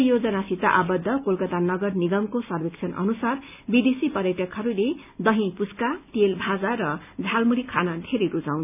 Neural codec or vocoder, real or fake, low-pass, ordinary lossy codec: none; real; 3.6 kHz; none